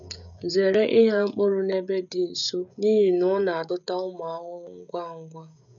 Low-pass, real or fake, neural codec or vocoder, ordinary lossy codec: 7.2 kHz; fake; codec, 16 kHz, 16 kbps, FreqCodec, smaller model; none